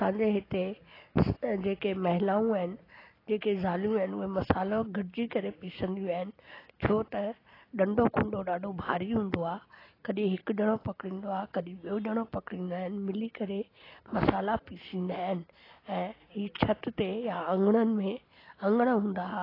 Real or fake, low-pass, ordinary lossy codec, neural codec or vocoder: real; 5.4 kHz; AAC, 24 kbps; none